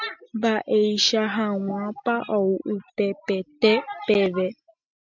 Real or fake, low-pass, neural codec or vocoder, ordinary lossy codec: real; 7.2 kHz; none; MP3, 64 kbps